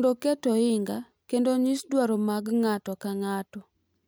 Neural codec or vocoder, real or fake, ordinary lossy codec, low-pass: none; real; none; none